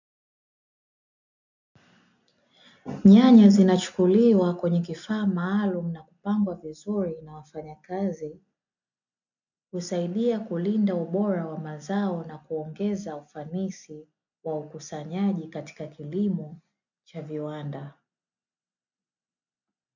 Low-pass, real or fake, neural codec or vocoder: 7.2 kHz; real; none